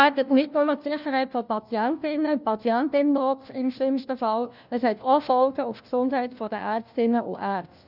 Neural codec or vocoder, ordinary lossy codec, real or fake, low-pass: codec, 16 kHz, 1 kbps, FunCodec, trained on LibriTTS, 50 frames a second; none; fake; 5.4 kHz